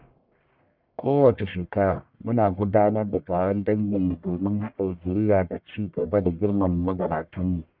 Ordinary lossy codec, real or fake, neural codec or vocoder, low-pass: none; fake; codec, 44.1 kHz, 1.7 kbps, Pupu-Codec; 5.4 kHz